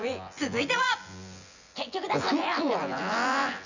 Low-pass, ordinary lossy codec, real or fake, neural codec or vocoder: 7.2 kHz; none; fake; vocoder, 24 kHz, 100 mel bands, Vocos